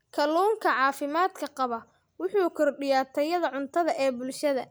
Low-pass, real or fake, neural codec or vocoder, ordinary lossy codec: none; real; none; none